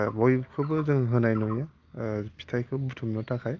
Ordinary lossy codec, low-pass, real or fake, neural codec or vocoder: Opus, 24 kbps; 7.2 kHz; real; none